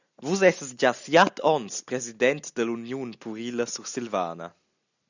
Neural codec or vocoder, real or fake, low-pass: none; real; 7.2 kHz